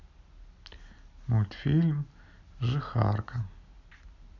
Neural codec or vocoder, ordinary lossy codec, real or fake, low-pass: none; none; real; 7.2 kHz